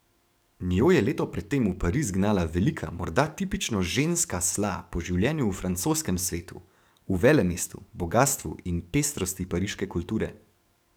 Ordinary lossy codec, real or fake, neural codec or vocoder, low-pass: none; fake; codec, 44.1 kHz, 7.8 kbps, DAC; none